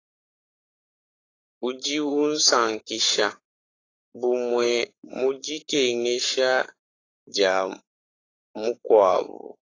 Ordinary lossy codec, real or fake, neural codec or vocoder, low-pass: AAC, 32 kbps; fake; codec, 44.1 kHz, 7.8 kbps, Pupu-Codec; 7.2 kHz